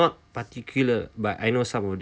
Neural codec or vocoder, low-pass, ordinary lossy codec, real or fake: none; none; none; real